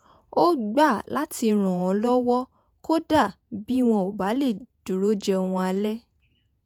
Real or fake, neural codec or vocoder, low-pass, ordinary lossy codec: fake; vocoder, 48 kHz, 128 mel bands, Vocos; 19.8 kHz; MP3, 96 kbps